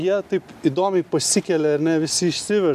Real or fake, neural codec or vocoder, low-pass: real; none; 14.4 kHz